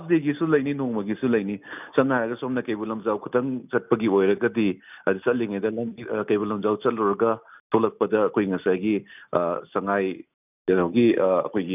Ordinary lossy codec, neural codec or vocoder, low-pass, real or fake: none; none; 3.6 kHz; real